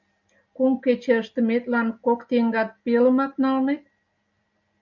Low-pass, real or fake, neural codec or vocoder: 7.2 kHz; real; none